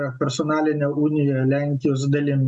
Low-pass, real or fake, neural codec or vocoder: 10.8 kHz; real; none